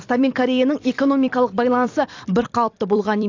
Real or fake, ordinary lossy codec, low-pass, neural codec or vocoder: fake; AAC, 48 kbps; 7.2 kHz; vocoder, 44.1 kHz, 128 mel bands every 256 samples, BigVGAN v2